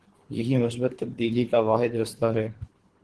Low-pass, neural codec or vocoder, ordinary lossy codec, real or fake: 10.8 kHz; codec, 24 kHz, 3 kbps, HILCodec; Opus, 16 kbps; fake